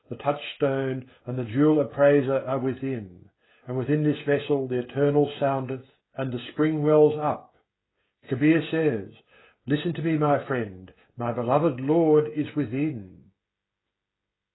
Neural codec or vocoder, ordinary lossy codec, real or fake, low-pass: codec, 16 kHz, 16 kbps, FreqCodec, smaller model; AAC, 16 kbps; fake; 7.2 kHz